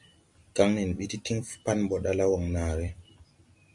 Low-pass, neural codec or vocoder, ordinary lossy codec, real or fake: 10.8 kHz; vocoder, 44.1 kHz, 128 mel bands every 256 samples, BigVGAN v2; MP3, 64 kbps; fake